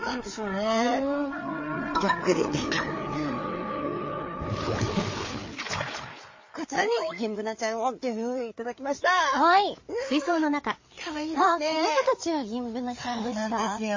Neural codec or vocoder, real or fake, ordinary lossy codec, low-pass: codec, 16 kHz, 4 kbps, FreqCodec, larger model; fake; MP3, 32 kbps; 7.2 kHz